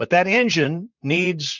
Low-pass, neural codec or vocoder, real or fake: 7.2 kHz; vocoder, 44.1 kHz, 128 mel bands, Pupu-Vocoder; fake